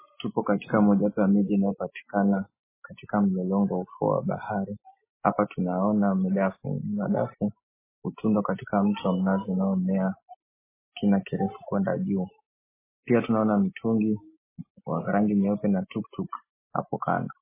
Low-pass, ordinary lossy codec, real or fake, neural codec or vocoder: 3.6 kHz; MP3, 16 kbps; real; none